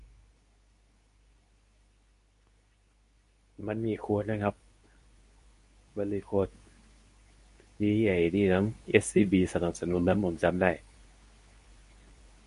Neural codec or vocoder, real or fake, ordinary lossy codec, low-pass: codec, 24 kHz, 0.9 kbps, WavTokenizer, medium speech release version 2; fake; MP3, 48 kbps; 10.8 kHz